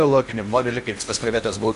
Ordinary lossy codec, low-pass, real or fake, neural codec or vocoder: MP3, 64 kbps; 10.8 kHz; fake; codec, 16 kHz in and 24 kHz out, 0.8 kbps, FocalCodec, streaming, 65536 codes